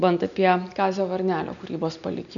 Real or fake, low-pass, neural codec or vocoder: real; 7.2 kHz; none